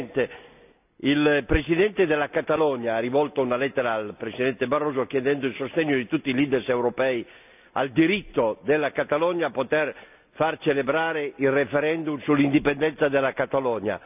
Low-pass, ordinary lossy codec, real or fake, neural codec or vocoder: 3.6 kHz; none; real; none